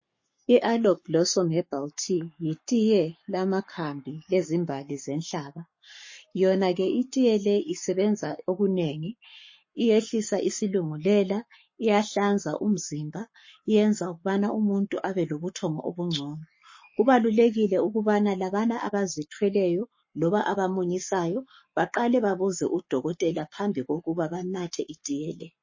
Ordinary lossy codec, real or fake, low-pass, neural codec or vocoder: MP3, 32 kbps; fake; 7.2 kHz; codec, 44.1 kHz, 7.8 kbps, Pupu-Codec